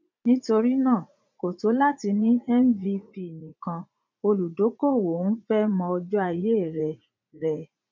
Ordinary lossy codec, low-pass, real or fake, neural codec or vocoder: none; 7.2 kHz; fake; vocoder, 44.1 kHz, 80 mel bands, Vocos